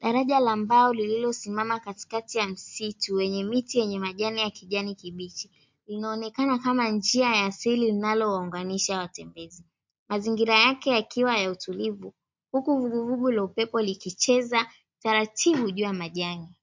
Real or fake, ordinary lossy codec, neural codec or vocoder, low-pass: real; MP3, 48 kbps; none; 7.2 kHz